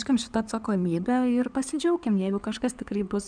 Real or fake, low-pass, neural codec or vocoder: fake; 9.9 kHz; codec, 16 kHz in and 24 kHz out, 2.2 kbps, FireRedTTS-2 codec